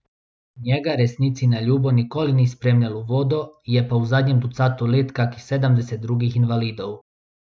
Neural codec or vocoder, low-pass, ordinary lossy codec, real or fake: none; none; none; real